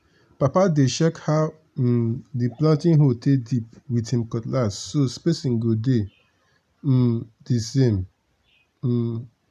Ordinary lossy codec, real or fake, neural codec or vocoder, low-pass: none; real; none; 14.4 kHz